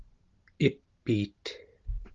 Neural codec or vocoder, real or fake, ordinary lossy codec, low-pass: none; real; Opus, 16 kbps; 7.2 kHz